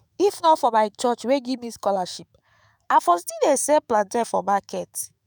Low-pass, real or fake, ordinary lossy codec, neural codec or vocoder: none; fake; none; autoencoder, 48 kHz, 128 numbers a frame, DAC-VAE, trained on Japanese speech